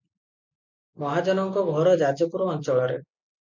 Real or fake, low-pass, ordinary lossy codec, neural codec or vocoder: real; 7.2 kHz; MP3, 64 kbps; none